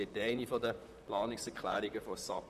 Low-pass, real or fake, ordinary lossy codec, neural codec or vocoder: 14.4 kHz; fake; none; vocoder, 44.1 kHz, 128 mel bands, Pupu-Vocoder